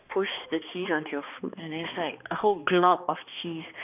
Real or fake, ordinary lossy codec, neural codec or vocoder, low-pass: fake; none; codec, 16 kHz, 2 kbps, X-Codec, HuBERT features, trained on balanced general audio; 3.6 kHz